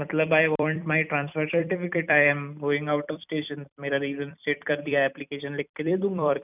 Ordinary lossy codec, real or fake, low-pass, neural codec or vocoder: none; fake; 3.6 kHz; vocoder, 44.1 kHz, 128 mel bands every 256 samples, BigVGAN v2